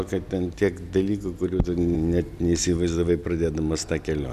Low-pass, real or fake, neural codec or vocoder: 14.4 kHz; real; none